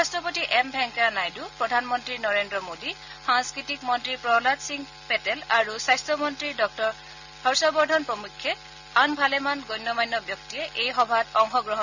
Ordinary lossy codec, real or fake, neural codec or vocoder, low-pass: none; real; none; 7.2 kHz